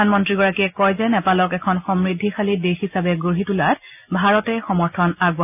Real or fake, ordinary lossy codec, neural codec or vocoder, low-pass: real; none; none; 3.6 kHz